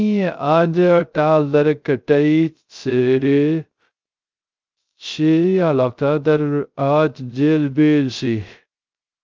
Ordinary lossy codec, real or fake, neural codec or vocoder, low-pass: Opus, 24 kbps; fake; codec, 16 kHz, 0.2 kbps, FocalCodec; 7.2 kHz